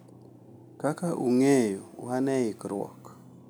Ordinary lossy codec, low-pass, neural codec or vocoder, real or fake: none; none; none; real